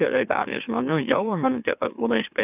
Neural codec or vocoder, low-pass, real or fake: autoencoder, 44.1 kHz, a latent of 192 numbers a frame, MeloTTS; 3.6 kHz; fake